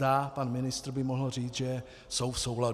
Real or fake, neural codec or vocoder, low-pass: real; none; 14.4 kHz